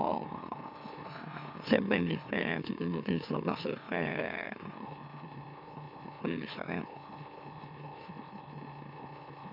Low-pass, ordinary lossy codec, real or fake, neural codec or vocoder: 5.4 kHz; none; fake; autoencoder, 44.1 kHz, a latent of 192 numbers a frame, MeloTTS